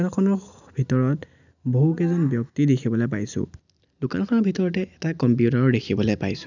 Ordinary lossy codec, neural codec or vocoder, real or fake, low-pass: none; none; real; 7.2 kHz